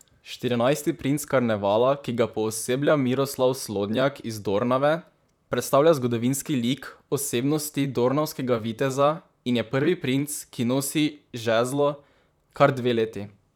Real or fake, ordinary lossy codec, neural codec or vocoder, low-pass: fake; none; vocoder, 44.1 kHz, 128 mel bands, Pupu-Vocoder; 19.8 kHz